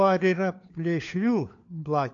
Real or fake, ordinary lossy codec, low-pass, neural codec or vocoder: fake; AAC, 64 kbps; 7.2 kHz; codec, 16 kHz, 4 kbps, FunCodec, trained on LibriTTS, 50 frames a second